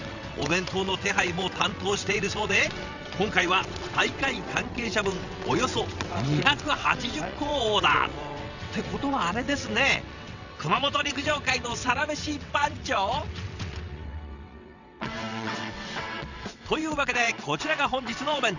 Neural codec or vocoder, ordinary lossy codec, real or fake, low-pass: vocoder, 22.05 kHz, 80 mel bands, WaveNeXt; none; fake; 7.2 kHz